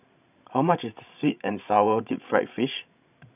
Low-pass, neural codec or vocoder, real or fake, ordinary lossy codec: 3.6 kHz; codec, 16 kHz, 16 kbps, FreqCodec, larger model; fake; none